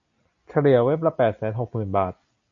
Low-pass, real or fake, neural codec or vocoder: 7.2 kHz; real; none